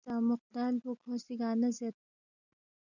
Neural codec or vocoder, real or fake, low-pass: none; real; 7.2 kHz